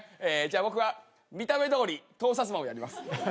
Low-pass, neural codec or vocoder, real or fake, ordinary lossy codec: none; none; real; none